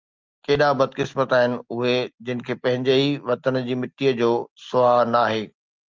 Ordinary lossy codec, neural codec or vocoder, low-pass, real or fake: Opus, 32 kbps; none; 7.2 kHz; real